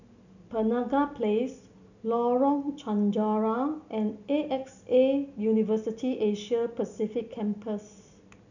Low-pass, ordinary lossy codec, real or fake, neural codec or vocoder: 7.2 kHz; none; real; none